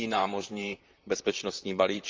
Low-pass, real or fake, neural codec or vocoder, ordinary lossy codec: 7.2 kHz; fake; vocoder, 44.1 kHz, 128 mel bands, Pupu-Vocoder; Opus, 32 kbps